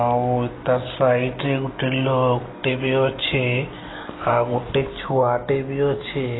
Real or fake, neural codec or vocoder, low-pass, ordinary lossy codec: real; none; 7.2 kHz; AAC, 16 kbps